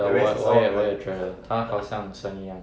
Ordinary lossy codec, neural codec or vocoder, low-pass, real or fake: none; none; none; real